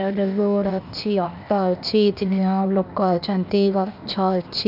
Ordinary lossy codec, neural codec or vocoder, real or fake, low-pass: none; codec, 16 kHz, 0.8 kbps, ZipCodec; fake; 5.4 kHz